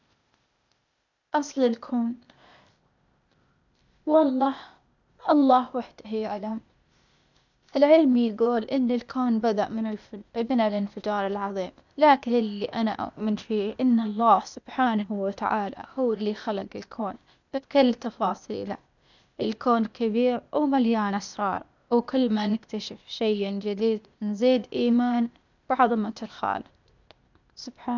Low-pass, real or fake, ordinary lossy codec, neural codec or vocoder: 7.2 kHz; fake; none; codec, 16 kHz, 0.8 kbps, ZipCodec